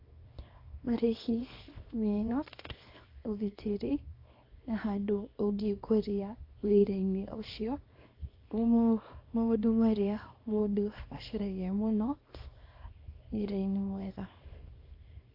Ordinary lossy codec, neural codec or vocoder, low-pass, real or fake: AAC, 32 kbps; codec, 24 kHz, 0.9 kbps, WavTokenizer, small release; 5.4 kHz; fake